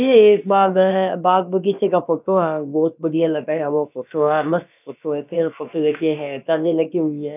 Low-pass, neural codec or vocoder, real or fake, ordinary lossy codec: 3.6 kHz; codec, 16 kHz, about 1 kbps, DyCAST, with the encoder's durations; fake; none